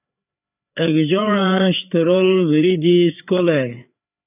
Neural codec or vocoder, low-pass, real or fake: codec, 16 kHz, 4 kbps, FreqCodec, larger model; 3.6 kHz; fake